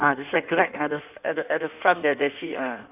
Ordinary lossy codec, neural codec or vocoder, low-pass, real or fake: none; codec, 16 kHz in and 24 kHz out, 1.1 kbps, FireRedTTS-2 codec; 3.6 kHz; fake